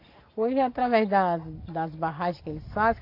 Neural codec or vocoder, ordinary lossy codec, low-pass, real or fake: none; AAC, 32 kbps; 5.4 kHz; real